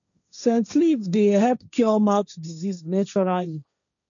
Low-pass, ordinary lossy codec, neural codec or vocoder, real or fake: 7.2 kHz; none; codec, 16 kHz, 1.1 kbps, Voila-Tokenizer; fake